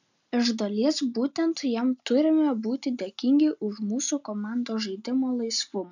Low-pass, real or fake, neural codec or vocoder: 7.2 kHz; real; none